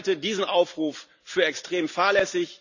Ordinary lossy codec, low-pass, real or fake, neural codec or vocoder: none; 7.2 kHz; real; none